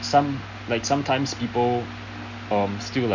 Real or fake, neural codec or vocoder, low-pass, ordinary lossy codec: real; none; 7.2 kHz; none